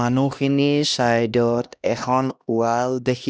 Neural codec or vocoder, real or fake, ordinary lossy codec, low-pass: codec, 16 kHz, 1 kbps, X-Codec, HuBERT features, trained on LibriSpeech; fake; none; none